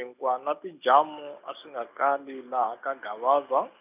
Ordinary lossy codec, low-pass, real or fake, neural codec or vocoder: none; 3.6 kHz; real; none